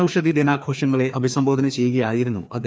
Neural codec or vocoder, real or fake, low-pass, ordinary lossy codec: codec, 16 kHz, 2 kbps, FreqCodec, larger model; fake; none; none